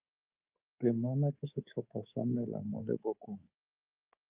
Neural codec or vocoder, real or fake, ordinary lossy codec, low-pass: codec, 24 kHz, 3.1 kbps, DualCodec; fake; Opus, 24 kbps; 3.6 kHz